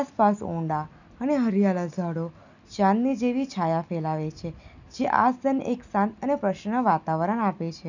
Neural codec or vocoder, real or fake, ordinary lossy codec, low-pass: none; real; none; 7.2 kHz